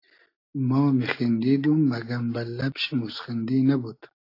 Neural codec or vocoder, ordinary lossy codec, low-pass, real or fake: none; AAC, 32 kbps; 5.4 kHz; real